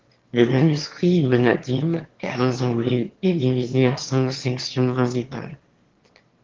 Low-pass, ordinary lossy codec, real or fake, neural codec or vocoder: 7.2 kHz; Opus, 16 kbps; fake; autoencoder, 22.05 kHz, a latent of 192 numbers a frame, VITS, trained on one speaker